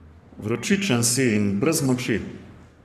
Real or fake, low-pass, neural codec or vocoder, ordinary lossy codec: fake; 14.4 kHz; codec, 44.1 kHz, 3.4 kbps, Pupu-Codec; none